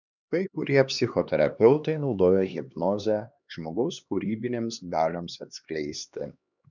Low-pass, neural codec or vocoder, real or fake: 7.2 kHz; codec, 16 kHz, 2 kbps, X-Codec, HuBERT features, trained on LibriSpeech; fake